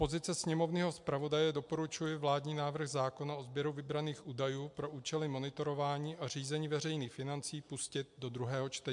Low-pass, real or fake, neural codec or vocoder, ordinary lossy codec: 10.8 kHz; real; none; MP3, 64 kbps